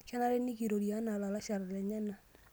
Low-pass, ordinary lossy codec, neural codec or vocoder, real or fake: none; none; none; real